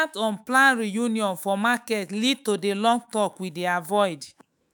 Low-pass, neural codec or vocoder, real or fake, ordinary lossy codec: none; autoencoder, 48 kHz, 128 numbers a frame, DAC-VAE, trained on Japanese speech; fake; none